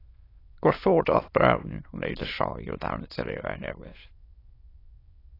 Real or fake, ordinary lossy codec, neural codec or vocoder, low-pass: fake; AAC, 24 kbps; autoencoder, 22.05 kHz, a latent of 192 numbers a frame, VITS, trained on many speakers; 5.4 kHz